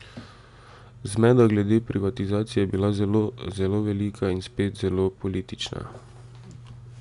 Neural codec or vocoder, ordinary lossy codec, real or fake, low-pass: none; none; real; 10.8 kHz